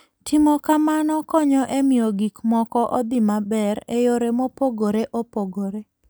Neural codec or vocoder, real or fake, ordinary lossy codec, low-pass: none; real; none; none